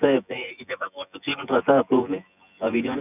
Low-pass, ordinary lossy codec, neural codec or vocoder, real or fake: 3.6 kHz; none; vocoder, 24 kHz, 100 mel bands, Vocos; fake